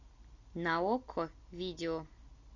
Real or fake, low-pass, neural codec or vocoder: real; 7.2 kHz; none